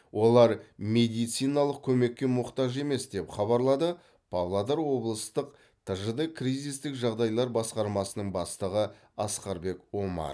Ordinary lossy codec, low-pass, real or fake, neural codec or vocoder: none; none; real; none